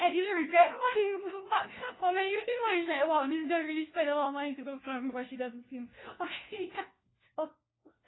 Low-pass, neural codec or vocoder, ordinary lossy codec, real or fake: 7.2 kHz; codec, 16 kHz, 1 kbps, FunCodec, trained on LibriTTS, 50 frames a second; AAC, 16 kbps; fake